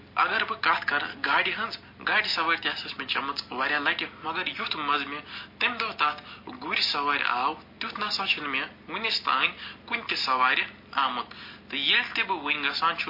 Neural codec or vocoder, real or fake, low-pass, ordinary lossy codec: none; real; 5.4 kHz; MP3, 32 kbps